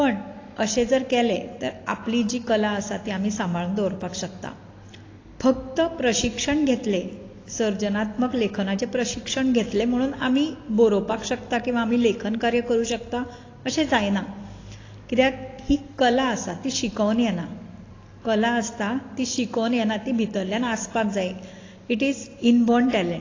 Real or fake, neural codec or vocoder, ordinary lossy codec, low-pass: real; none; AAC, 32 kbps; 7.2 kHz